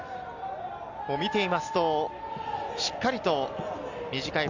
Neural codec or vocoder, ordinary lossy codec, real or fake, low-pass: none; Opus, 64 kbps; real; 7.2 kHz